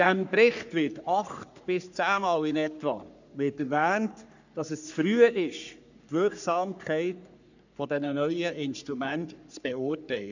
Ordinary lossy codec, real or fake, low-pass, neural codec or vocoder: none; fake; 7.2 kHz; codec, 44.1 kHz, 3.4 kbps, Pupu-Codec